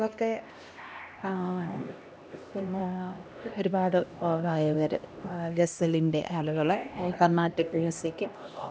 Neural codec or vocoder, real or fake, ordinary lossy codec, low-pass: codec, 16 kHz, 1 kbps, X-Codec, HuBERT features, trained on LibriSpeech; fake; none; none